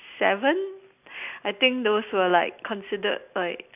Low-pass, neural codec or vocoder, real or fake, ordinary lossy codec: 3.6 kHz; none; real; none